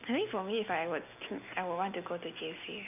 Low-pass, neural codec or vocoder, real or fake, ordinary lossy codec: 3.6 kHz; none; real; none